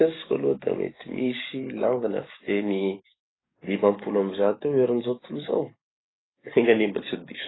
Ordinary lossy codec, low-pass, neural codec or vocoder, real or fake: AAC, 16 kbps; 7.2 kHz; codec, 44.1 kHz, 7.8 kbps, DAC; fake